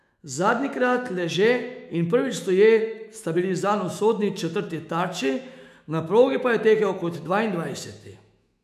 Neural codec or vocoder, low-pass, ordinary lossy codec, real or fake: autoencoder, 48 kHz, 128 numbers a frame, DAC-VAE, trained on Japanese speech; 14.4 kHz; none; fake